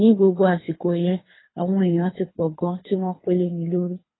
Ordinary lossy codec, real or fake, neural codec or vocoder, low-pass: AAC, 16 kbps; fake; codec, 24 kHz, 3 kbps, HILCodec; 7.2 kHz